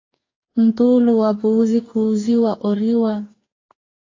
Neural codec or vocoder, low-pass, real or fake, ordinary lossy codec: codec, 44.1 kHz, 2.6 kbps, DAC; 7.2 kHz; fake; AAC, 32 kbps